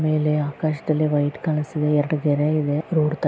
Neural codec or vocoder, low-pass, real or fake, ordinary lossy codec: none; none; real; none